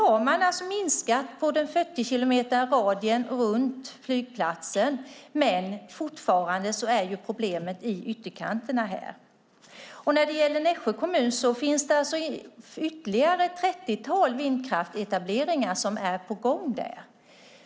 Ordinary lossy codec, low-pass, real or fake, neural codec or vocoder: none; none; real; none